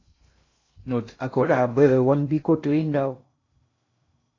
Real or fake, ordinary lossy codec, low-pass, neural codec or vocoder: fake; AAC, 32 kbps; 7.2 kHz; codec, 16 kHz in and 24 kHz out, 0.6 kbps, FocalCodec, streaming, 2048 codes